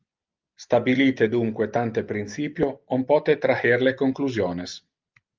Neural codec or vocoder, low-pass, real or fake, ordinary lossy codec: none; 7.2 kHz; real; Opus, 24 kbps